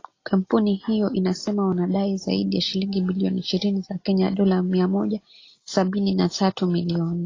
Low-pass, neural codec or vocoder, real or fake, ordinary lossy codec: 7.2 kHz; none; real; AAC, 32 kbps